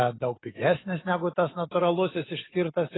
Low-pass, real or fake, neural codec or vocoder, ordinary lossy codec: 7.2 kHz; real; none; AAC, 16 kbps